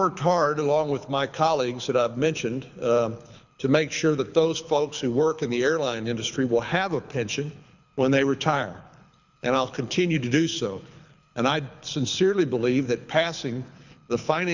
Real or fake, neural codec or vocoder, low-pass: fake; codec, 24 kHz, 6 kbps, HILCodec; 7.2 kHz